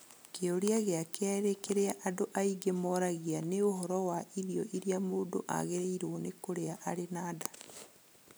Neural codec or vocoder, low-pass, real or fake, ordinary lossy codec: none; none; real; none